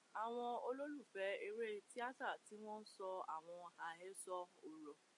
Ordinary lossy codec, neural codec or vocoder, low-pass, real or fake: MP3, 48 kbps; none; 10.8 kHz; real